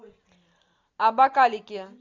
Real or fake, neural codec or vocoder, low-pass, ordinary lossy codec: real; none; 7.2 kHz; MP3, 64 kbps